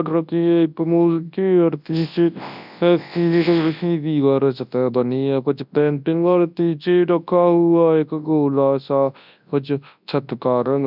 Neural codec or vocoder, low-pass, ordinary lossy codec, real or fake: codec, 24 kHz, 0.9 kbps, WavTokenizer, large speech release; 5.4 kHz; none; fake